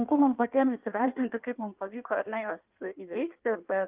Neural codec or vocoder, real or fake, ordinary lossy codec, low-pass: codec, 16 kHz in and 24 kHz out, 1.1 kbps, FireRedTTS-2 codec; fake; Opus, 32 kbps; 3.6 kHz